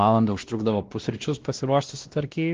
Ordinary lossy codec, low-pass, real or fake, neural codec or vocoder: Opus, 16 kbps; 7.2 kHz; fake; codec, 16 kHz, 1 kbps, X-Codec, WavLM features, trained on Multilingual LibriSpeech